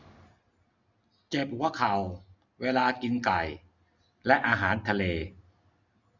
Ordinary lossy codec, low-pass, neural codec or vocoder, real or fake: none; 7.2 kHz; none; real